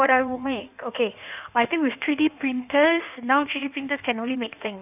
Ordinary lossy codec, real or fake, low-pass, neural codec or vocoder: none; fake; 3.6 kHz; codec, 16 kHz in and 24 kHz out, 1.1 kbps, FireRedTTS-2 codec